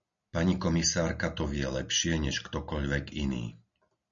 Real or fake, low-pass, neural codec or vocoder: real; 7.2 kHz; none